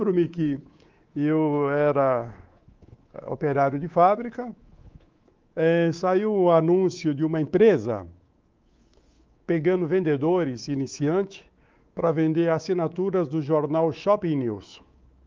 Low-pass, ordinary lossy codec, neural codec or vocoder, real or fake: 7.2 kHz; Opus, 32 kbps; codec, 24 kHz, 3.1 kbps, DualCodec; fake